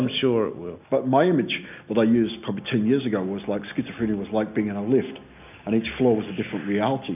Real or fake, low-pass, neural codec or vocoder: real; 3.6 kHz; none